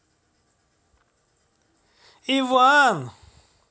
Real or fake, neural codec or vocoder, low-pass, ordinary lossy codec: real; none; none; none